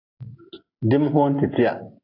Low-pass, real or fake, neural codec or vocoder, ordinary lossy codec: 5.4 kHz; real; none; AAC, 32 kbps